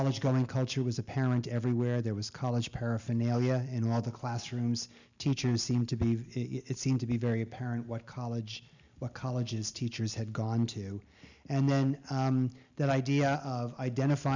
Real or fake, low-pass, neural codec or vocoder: real; 7.2 kHz; none